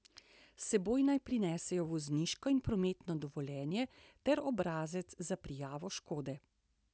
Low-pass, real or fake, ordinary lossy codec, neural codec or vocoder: none; real; none; none